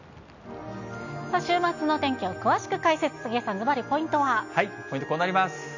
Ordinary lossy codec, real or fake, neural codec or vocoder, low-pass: none; real; none; 7.2 kHz